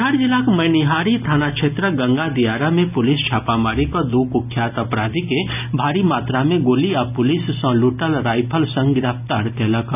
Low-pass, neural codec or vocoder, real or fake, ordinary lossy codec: 3.6 kHz; none; real; none